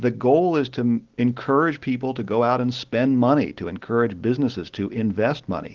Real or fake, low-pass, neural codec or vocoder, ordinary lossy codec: real; 7.2 kHz; none; Opus, 16 kbps